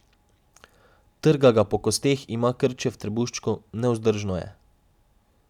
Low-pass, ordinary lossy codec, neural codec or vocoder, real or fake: 19.8 kHz; none; none; real